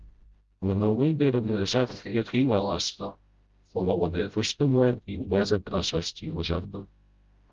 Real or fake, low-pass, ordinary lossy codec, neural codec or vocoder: fake; 7.2 kHz; Opus, 32 kbps; codec, 16 kHz, 0.5 kbps, FreqCodec, smaller model